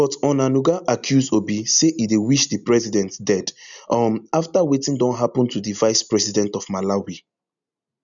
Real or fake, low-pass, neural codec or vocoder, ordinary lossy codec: real; 7.2 kHz; none; none